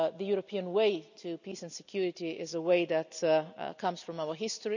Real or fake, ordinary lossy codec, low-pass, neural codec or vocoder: real; none; 7.2 kHz; none